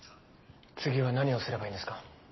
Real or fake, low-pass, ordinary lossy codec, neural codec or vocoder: real; 7.2 kHz; MP3, 24 kbps; none